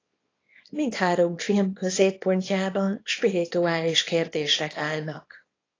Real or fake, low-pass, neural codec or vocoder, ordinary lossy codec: fake; 7.2 kHz; codec, 24 kHz, 0.9 kbps, WavTokenizer, small release; AAC, 32 kbps